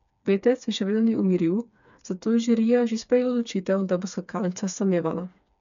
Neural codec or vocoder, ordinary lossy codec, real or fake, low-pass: codec, 16 kHz, 4 kbps, FreqCodec, smaller model; none; fake; 7.2 kHz